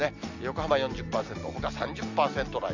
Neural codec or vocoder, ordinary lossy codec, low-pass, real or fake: none; Opus, 64 kbps; 7.2 kHz; real